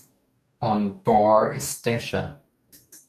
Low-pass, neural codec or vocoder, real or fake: 14.4 kHz; codec, 44.1 kHz, 2.6 kbps, DAC; fake